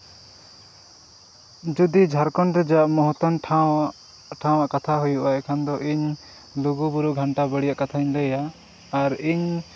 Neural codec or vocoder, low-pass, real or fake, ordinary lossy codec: none; none; real; none